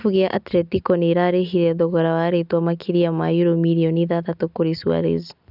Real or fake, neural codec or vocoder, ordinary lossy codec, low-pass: real; none; none; 5.4 kHz